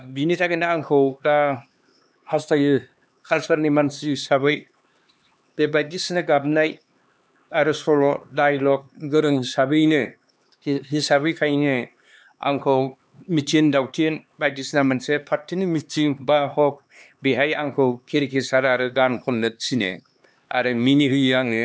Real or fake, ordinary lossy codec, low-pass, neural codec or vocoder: fake; none; none; codec, 16 kHz, 2 kbps, X-Codec, HuBERT features, trained on LibriSpeech